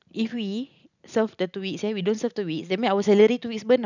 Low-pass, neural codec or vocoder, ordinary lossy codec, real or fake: 7.2 kHz; none; none; real